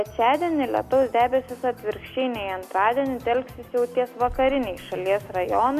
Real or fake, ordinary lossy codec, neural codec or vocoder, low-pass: real; MP3, 96 kbps; none; 14.4 kHz